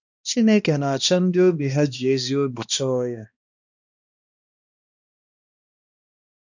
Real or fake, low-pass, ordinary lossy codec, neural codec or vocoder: fake; 7.2 kHz; none; codec, 16 kHz, 1 kbps, X-Codec, HuBERT features, trained on balanced general audio